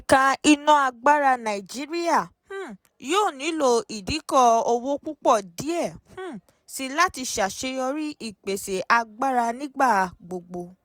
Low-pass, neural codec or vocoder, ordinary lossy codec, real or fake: none; none; none; real